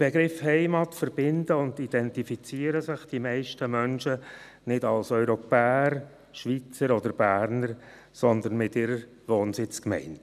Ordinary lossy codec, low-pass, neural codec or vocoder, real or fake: none; 14.4 kHz; none; real